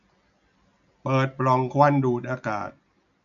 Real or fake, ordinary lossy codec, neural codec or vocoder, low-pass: real; none; none; 7.2 kHz